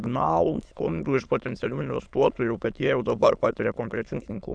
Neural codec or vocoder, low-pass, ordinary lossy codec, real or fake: autoencoder, 22.05 kHz, a latent of 192 numbers a frame, VITS, trained on many speakers; 9.9 kHz; Opus, 64 kbps; fake